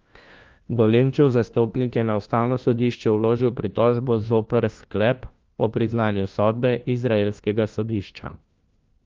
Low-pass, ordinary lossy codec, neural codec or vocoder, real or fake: 7.2 kHz; Opus, 32 kbps; codec, 16 kHz, 1 kbps, FunCodec, trained on LibriTTS, 50 frames a second; fake